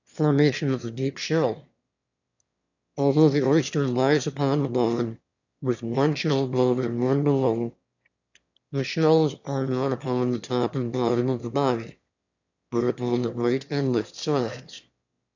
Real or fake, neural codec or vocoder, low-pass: fake; autoencoder, 22.05 kHz, a latent of 192 numbers a frame, VITS, trained on one speaker; 7.2 kHz